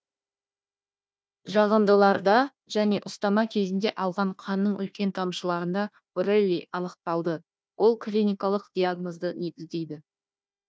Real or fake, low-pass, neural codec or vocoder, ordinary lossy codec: fake; none; codec, 16 kHz, 1 kbps, FunCodec, trained on Chinese and English, 50 frames a second; none